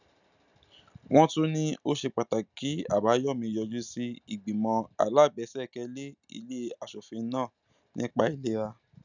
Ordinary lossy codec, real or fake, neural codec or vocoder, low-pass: none; real; none; 7.2 kHz